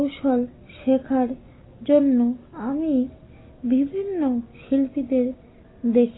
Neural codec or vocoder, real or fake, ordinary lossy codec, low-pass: none; real; AAC, 16 kbps; 7.2 kHz